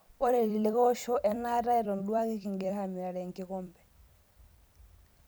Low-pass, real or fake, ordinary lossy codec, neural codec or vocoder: none; fake; none; vocoder, 44.1 kHz, 128 mel bands every 256 samples, BigVGAN v2